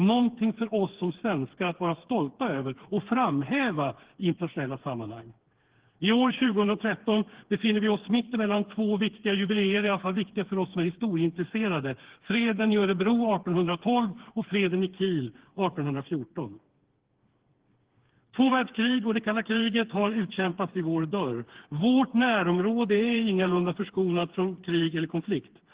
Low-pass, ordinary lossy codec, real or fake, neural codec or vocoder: 3.6 kHz; Opus, 16 kbps; fake; codec, 16 kHz, 4 kbps, FreqCodec, smaller model